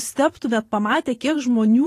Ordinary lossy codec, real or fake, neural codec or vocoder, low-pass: AAC, 48 kbps; fake; vocoder, 44.1 kHz, 128 mel bands every 512 samples, BigVGAN v2; 14.4 kHz